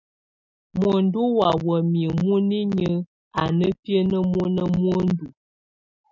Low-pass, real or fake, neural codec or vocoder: 7.2 kHz; real; none